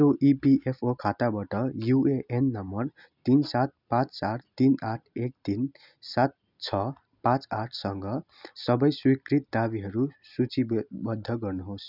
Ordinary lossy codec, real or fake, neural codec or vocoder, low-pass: none; real; none; 5.4 kHz